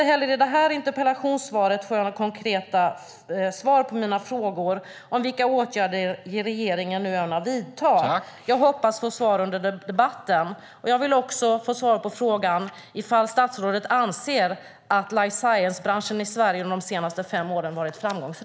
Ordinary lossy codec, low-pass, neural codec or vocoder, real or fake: none; none; none; real